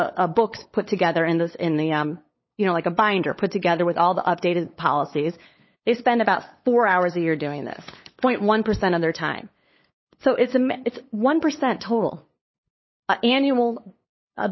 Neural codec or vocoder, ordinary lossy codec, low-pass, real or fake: codec, 16 kHz, 8 kbps, FunCodec, trained on LibriTTS, 25 frames a second; MP3, 24 kbps; 7.2 kHz; fake